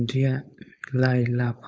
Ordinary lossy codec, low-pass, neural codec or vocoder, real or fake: none; none; codec, 16 kHz, 4.8 kbps, FACodec; fake